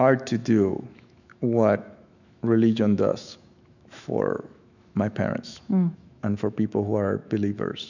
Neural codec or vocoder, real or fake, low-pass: autoencoder, 48 kHz, 128 numbers a frame, DAC-VAE, trained on Japanese speech; fake; 7.2 kHz